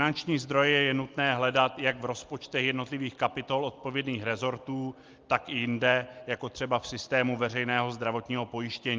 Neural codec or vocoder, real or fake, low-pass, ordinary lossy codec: none; real; 7.2 kHz; Opus, 24 kbps